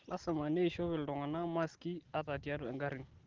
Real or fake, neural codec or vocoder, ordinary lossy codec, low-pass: real; none; Opus, 16 kbps; 7.2 kHz